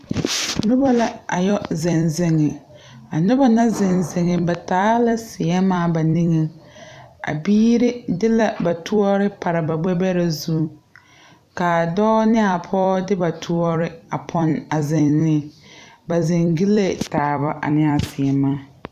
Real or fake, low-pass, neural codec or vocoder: fake; 14.4 kHz; vocoder, 44.1 kHz, 128 mel bands every 256 samples, BigVGAN v2